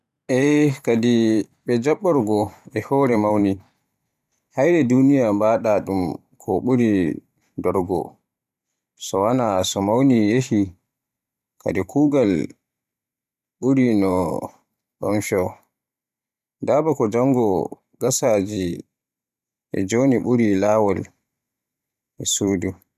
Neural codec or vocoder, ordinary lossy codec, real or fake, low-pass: vocoder, 44.1 kHz, 128 mel bands every 512 samples, BigVGAN v2; none; fake; 14.4 kHz